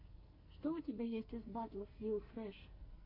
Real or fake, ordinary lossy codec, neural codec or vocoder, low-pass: fake; MP3, 32 kbps; codec, 44.1 kHz, 2.6 kbps, SNAC; 5.4 kHz